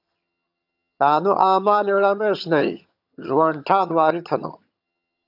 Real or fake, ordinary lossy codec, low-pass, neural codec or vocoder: fake; AAC, 48 kbps; 5.4 kHz; vocoder, 22.05 kHz, 80 mel bands, HiFi-GAN